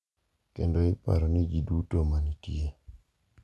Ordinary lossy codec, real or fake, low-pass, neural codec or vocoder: none; real; none; none